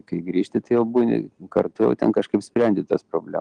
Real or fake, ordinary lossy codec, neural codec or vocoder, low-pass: real; Opus, 24 kbps; none; 9.9 kHz